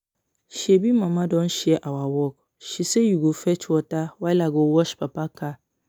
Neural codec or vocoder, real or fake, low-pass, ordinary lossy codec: none; real; none; none